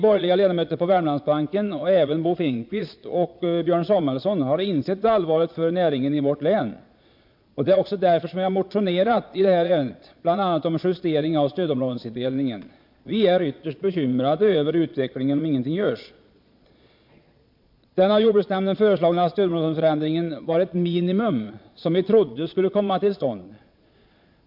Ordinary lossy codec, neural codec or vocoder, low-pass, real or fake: MP3, 48 kbps; vocoder, 22.05 kHz, 80 mel bands, Vocos; 5.4 kHz; fake